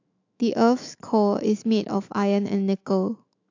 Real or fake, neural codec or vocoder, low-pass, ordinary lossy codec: fake; autoencoder, 48 kHz, 128 numbers a frame, DAC-VAE, trained on Japanese speech; 7.2 kHz; AAC, 48 kbps